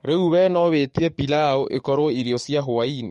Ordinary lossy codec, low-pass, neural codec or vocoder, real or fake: MP3, 48 kbps; 19.8 kHz; codec, 44.1 kHz, 7.8 kbps, DAC; fake